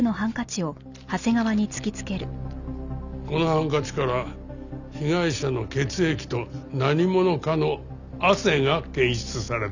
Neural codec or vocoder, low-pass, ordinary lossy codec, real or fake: none; 7.2 kHz; none; real